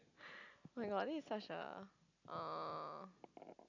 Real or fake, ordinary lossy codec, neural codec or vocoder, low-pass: real; none; none; 7.2 kHz